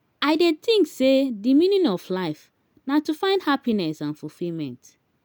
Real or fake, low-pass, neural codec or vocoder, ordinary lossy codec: real; none; none; none